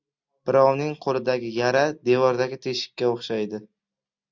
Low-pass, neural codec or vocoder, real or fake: 7.2 kHz; none; real